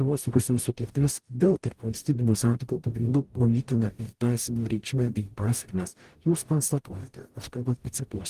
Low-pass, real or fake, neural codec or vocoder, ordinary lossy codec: 14.4 kHz; fake; codec, 44.1 kHz, 0.9 kbps, DAC; Opus, 24 kbps